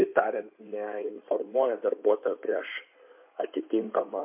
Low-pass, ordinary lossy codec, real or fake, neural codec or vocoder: 3.6 kHz; MP3, 32 kbps; fake; codec, 16 kHz in and 24 kHz out, 2.2 kbps, FireRedTTS-2 codec